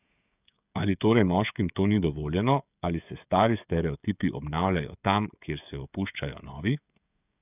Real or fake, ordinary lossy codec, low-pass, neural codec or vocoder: fake; none; 3.6 kHz; codec, 16 kHz, 16 kbps, FreqCodec, smaller model